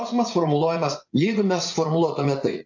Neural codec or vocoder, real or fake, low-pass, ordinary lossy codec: vocoder, 44.1 kHz, 80 mel bands, Vocos; fake; 7.2 kHz; AAC, 48 kbps